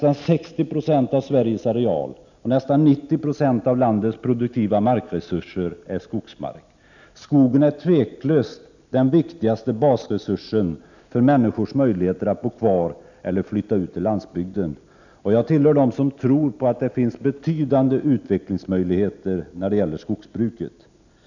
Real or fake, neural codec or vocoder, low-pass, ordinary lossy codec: real; none; 7.2 kHz; none